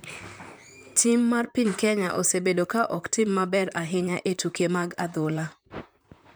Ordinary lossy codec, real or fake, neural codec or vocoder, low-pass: none; fake; vocoder, 44.1 kHz, 128 mel bands, Pupu-Vocoder; none